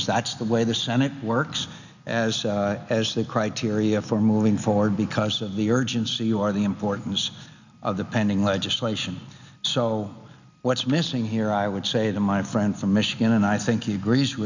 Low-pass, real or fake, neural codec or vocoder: 7.2 kHz; real; none